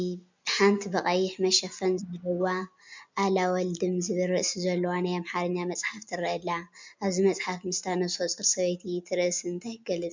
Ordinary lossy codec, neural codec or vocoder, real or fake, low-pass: MP3, 64 kbps; none; real; 7.2 kHz